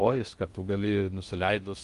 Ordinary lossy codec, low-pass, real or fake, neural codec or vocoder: Opus, 32 kbps; 10.8 kHz; fake; codec, 16 kHz in and 24 kHz out, 0.6 kbps, FocalCodec, streaming, 2048 codes